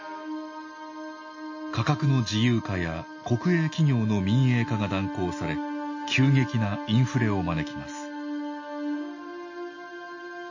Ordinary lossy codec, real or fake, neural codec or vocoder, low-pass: MP3, 32 kbps; real; none; 7.2 kHz